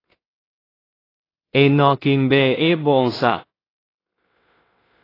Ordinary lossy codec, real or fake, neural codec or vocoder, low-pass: AAC, 24 kbps; fake; codec, 16 kHz in and 24 kHz out, 0.4 kbps, LongCat-Audio-Codec, two codebook decoder; 5.4 kHz